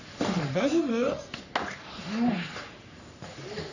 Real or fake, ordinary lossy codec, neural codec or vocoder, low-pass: fake; none; codec, 44.1 kHz, 3.4 kbps, Pupu-Codec; 7.2 kHz